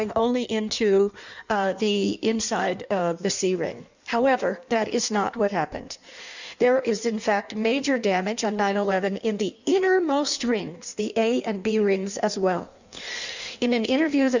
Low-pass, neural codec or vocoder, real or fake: 7.2 kHz; codec, 16 kHz in and 24 kHz out, 1.1 kbps, FireRedTTS-2 codec; fake